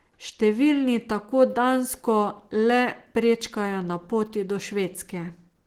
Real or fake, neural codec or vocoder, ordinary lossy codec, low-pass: real; none; Opus, 16 kbps; 19.8 kHz